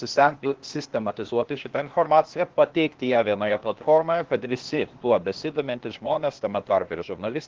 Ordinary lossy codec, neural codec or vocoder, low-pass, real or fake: Opus, 16 kbps; codec, 16 kHz, 0.8 kbps, ZipCodec; 7.2 kHz; fake